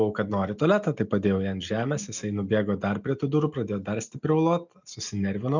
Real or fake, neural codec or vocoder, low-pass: real; none; 7.2 kHz